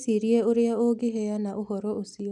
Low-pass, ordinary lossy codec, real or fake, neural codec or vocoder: none; none; real; none